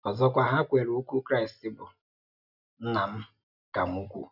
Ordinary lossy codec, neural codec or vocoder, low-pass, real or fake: none; none; 5.4 kHz; real